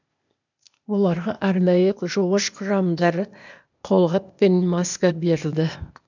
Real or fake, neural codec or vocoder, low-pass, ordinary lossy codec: fake; codec, 16 kHz, 0.8 kbps, ZipCodec; 7.2 kHz; none